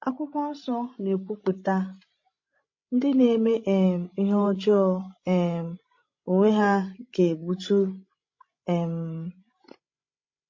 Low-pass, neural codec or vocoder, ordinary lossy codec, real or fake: 7.2 kHz; codec, 16 kHz, 16 kbps, FreqCodec, larger model; MP3, 32 kbps; fake